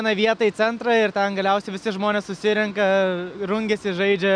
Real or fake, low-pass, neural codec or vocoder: real; 9.9 kHz; none